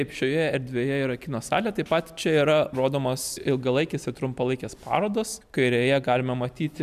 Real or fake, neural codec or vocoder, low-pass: real; none; 14.4 kHz